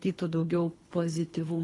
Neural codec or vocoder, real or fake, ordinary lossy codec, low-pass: codec, 24 kHz, 3 kbps, HILCodec; fake; AAC, 32 kbps; 10.8 kHz